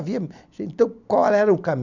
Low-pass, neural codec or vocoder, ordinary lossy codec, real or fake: 7.2 kHz; none; none; real